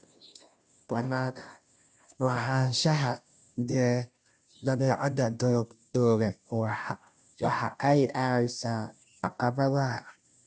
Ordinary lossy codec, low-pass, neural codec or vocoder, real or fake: none; none; codec, 16 kHz, 0.5 kbps, FunCodec, trained on Chinese and English, 25 frames a second; fake